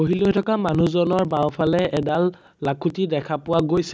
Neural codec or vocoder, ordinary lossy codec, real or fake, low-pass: none; none; real; none